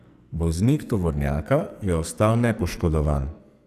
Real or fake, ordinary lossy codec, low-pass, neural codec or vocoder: fake; none; 14.4 kHz; codec, 44.1 kHz, 2.6 kbps, SNAC